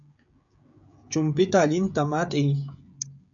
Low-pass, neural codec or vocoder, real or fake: 7.2 kHz; codec, 16 kHz, 16 kbps, FreqCodec, smaller model; fake